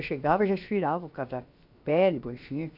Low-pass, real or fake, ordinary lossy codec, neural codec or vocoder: 5.4 kHz; fake; none; codec, 16 kHz, about 1 kbps, DyCAST, with the encoder's durations